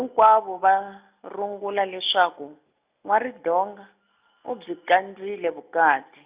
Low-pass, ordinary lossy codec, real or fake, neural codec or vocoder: 3.6 kHz; Opus, 64 kbps; real; none